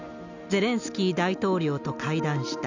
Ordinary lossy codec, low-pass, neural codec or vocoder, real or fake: none; 7.2 kHz; none; real